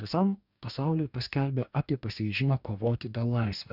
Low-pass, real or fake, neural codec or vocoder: 5.4 kHz; fake; codec, 44.1 kHz, 2.6 kbps, DAC